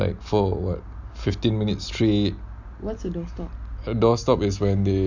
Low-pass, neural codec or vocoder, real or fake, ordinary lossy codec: 7.2 kHz; none; real; none